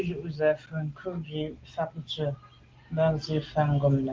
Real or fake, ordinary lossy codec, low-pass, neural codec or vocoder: real; Opus, 16 kbps; 7.2 kHz; none